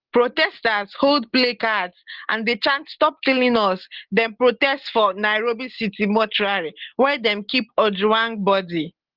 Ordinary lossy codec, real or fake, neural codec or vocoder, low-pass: Opus, 16 kbps; real; none; 5.4 kHz